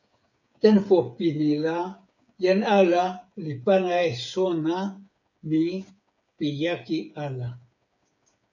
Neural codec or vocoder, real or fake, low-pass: codec, 16 kHz, 8 kbps, FreqCodec, smaller model; fake; 7.2 kHz